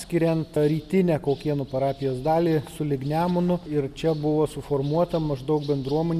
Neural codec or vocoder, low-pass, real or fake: none; 14.4 kHz; real